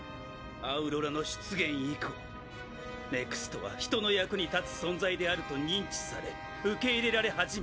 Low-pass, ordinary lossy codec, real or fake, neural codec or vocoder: none; none; real; none